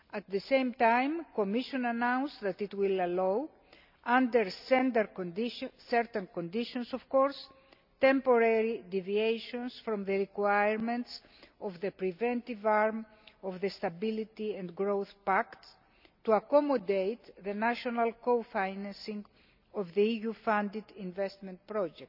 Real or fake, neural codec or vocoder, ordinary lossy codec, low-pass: real; none; none; 5.4 kHz